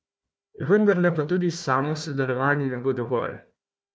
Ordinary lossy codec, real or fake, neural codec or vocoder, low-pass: none; fake; codec, 16 kHz, 1 kbps, FunCodec, trained on Chinese and English, 50 frames a second; none